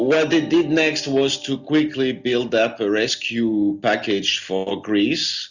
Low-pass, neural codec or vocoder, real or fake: 7.2 kHz; none; real